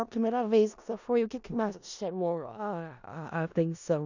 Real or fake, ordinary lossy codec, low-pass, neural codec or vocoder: fake; none; 7.2 kHz; codec, 16 kHz in and 24 kHz out, 0.4 kbps, LongCat-Audio-Codec, four codebook decoder